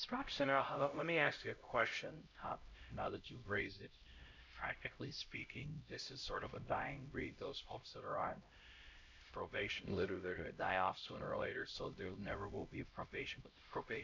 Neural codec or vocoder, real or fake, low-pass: codec, 16 kHz, 0.5 kbps, X-Codec, HuBERT features, trained on LibriSpeech; fake; 7.2 kHz